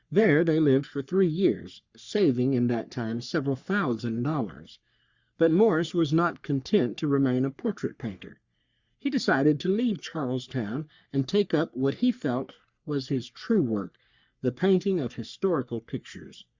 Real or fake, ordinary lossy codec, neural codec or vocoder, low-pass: fake; Opus, 64 kbps; codec, 44.1 kHz, 3.4 kbps, Pupu-Codec; 7.2 kHz